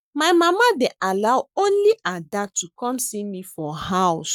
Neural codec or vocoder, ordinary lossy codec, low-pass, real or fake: codec, 44.1 kHz, 7.8 kbps, Pupu-Codec; none; 19.8 kHz; fake